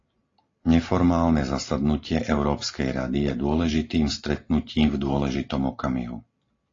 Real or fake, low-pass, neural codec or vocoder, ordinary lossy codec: real; 7.2 kHz; none; AAC, 32 kbps